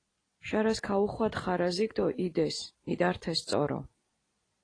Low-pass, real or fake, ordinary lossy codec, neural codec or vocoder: 9.9 kHz; fake; AAC, 32 kbps; vocoder, 44.1 kHz, 128 mel bands every 256 samples, BigVGAN v2